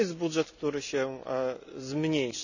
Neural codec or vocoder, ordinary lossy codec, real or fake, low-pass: none; none; real; 7.2 kHz